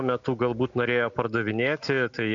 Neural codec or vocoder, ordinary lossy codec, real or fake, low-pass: none; MP3, 64 kbps; real; 7.2 kHz